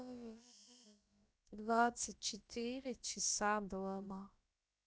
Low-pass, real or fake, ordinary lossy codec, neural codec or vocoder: none; fake; none; codec, 16 kHz, about 1 kbps, DyCAST, with the encoder's durations